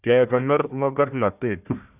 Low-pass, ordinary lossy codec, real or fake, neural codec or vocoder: 3.6 kHz; none; fake; codec, 16 kHz, 1 kbps, FunCodec, trained on Chinese and English, 50 frames a second